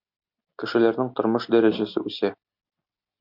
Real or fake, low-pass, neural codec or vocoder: real; 5.4 kHz; none